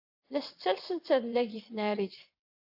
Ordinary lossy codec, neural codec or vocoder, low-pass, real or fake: AAC, 48 kbps; vocoder, 22.05 kHz, 80 mel bands, WaveNeXt; 5.4 kHz; fake